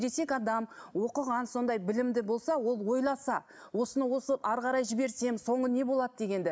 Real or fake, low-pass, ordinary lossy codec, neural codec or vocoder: real; none; none; none